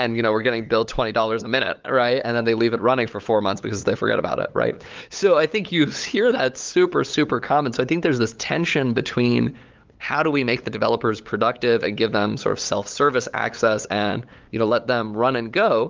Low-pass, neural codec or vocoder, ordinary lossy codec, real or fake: 7.2 kHz; codec, 16 kHz, 8 kbps, FunCodec, trained on LibriTTS, 25 frames a second; Opus, 32 kbps; fake